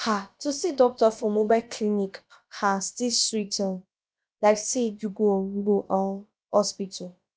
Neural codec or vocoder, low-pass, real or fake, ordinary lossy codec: codec, 16 kHz, about 1 kbps, DyCAST, with the encoder's durations; none; fake; none